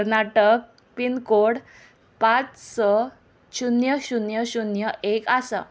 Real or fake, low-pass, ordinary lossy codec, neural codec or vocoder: real; none; none; none